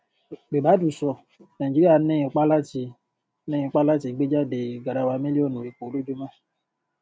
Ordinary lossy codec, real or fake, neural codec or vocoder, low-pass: none; real; none; none